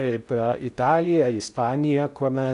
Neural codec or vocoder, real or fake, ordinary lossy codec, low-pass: codec, 16 kHz in and 24 kHz out, 0.6 kbps, FocalCodec, streaming, 4096 codes; fake; AAC, 64 kbps; 10.8 kHz